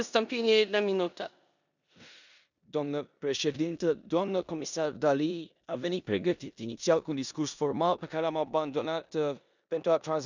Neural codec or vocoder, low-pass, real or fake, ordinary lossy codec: codec, 16 kHz in and 24 kHz out, 0.9 kbps, LongCat-Audio-Codec, four codebook decoder; 7.2 kHz; fake; none